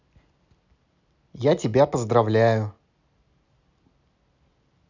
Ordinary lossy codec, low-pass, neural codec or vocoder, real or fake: none; 7.2 kHz; none; real